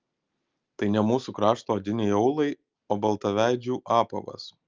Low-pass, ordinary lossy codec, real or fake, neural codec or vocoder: 7.2 kHz; Opus, 24 kbps; real; none